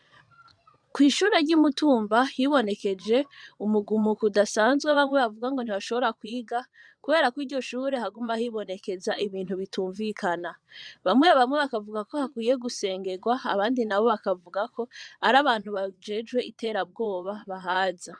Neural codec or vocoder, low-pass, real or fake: vocoder, 22.05 kHz, 80 mel bands, Vocos; 9.9 kHz; fake